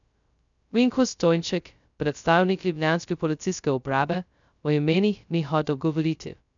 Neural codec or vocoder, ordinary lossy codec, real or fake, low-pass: codec, 16 kHz, 0.2 kbps, FocalCodec; none; fake; 7.2 kHz